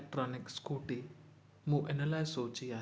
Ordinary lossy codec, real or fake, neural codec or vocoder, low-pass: none; real; none; none